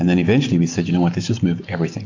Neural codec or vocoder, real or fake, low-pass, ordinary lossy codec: none; real; 7.2 kHz; AAC, 48 kbps